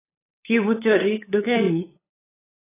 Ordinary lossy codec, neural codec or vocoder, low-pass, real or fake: AAC, 16 kbps; codec, 16 kHz, 8 kbps, FunCodec, trained on LibriTTS, 25 frames a second; 3.6 kHz; fake